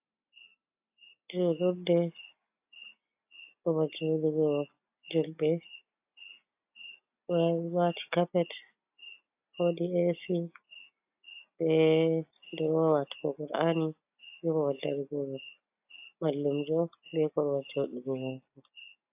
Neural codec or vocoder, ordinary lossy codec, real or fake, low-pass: none; AAC, 32 kbps; real; 3.6 kHz